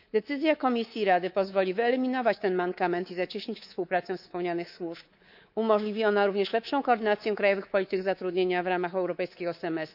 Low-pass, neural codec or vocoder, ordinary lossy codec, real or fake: 5.4 kHz; codec, 24 kHz, 3.1 kbps, DualCodec; none; fake